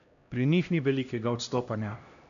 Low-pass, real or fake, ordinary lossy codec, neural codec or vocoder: 7.2 kHz; fake; none; codec, 16 kHz, 1 kbps, X-Codec, HuBERT features, trained on LibriSpeech